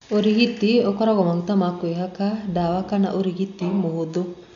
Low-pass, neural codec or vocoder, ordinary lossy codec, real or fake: 7.2 kHz; none; none; real